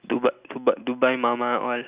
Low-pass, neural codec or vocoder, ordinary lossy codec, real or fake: 3.6 kHz; none; Opus, 64 kbps; real